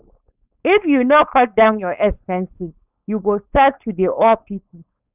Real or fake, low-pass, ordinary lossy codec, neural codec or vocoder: fake; 3.6 kHz; none; codec, 16 kHz, 4.8 kbps, FACodec